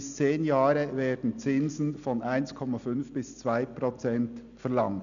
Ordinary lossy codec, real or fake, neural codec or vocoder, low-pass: none; real; none; 7.2 kHz